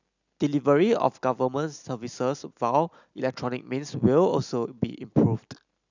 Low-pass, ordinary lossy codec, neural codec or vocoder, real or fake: 7.2 kHz; none; none; real